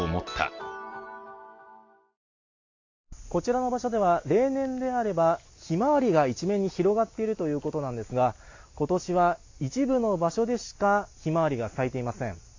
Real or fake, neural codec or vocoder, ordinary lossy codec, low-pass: real; none; AAC, 48 kbps; 7.2 kHz